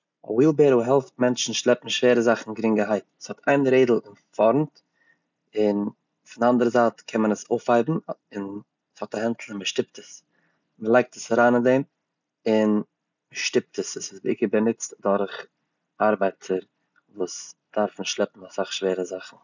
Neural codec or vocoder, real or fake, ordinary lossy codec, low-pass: none; real; none; 7.2 kHz